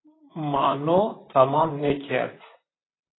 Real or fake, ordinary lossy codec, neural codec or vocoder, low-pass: fake; AAC, 16 kbps; vocoder, 44.1 kHz, 128 mel bands, Pupu-Vocoder; 7.2 kHz